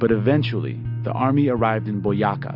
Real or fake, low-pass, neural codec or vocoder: real; 5.4 kHz; none